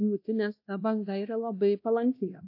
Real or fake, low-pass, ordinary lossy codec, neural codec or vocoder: fake; 5.4 kHz; AAC, 48 kbps; codec, 16 kHz, 1 kbps, X-Codec, WavLM features, trained on Multilingual LibriSpeech